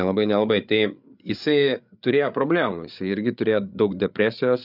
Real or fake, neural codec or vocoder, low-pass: fake; codec, 16 kHz, 8 kbps, FreqCodec, larger model; 5.4 kHz